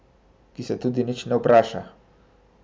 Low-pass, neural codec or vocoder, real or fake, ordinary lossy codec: none; none; real; none